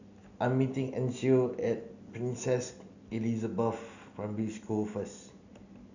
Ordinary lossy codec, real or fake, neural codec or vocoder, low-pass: none; real; none; 7.2 kHz